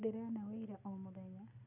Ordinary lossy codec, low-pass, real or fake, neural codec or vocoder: none; 3.6 kHz; real; none